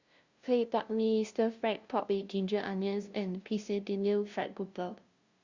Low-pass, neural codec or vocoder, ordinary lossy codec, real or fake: 7.2 kHz; codec, 16 kHz, 0.5 kbps, FunCodec, trained on LibriTTS, 25 frames a second; Opus, 64 kbps; fake